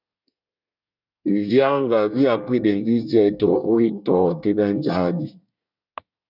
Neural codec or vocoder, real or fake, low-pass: codec, 24 kHz, 1 kbps, SNAC; fake; 5.4 kHz